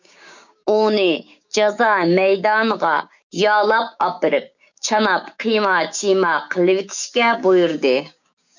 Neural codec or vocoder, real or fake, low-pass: codec, 16 kHz, 6 kbps, DAC; fake; 7.2 kHz